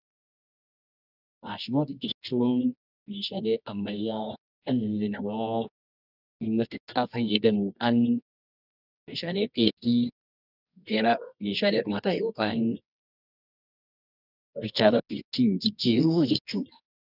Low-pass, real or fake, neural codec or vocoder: 5.4 kHz; fake; codec, 24 kHz, 0.9 kbps, WavTokenizer, medium music audio release